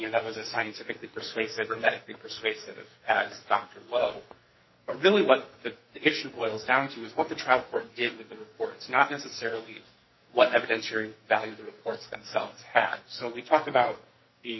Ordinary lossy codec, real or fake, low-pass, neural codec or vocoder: MP3, 24 kbps; fake; 7.2 kHz; codec, 44.1 kHz, 2.6 kbps, SNAC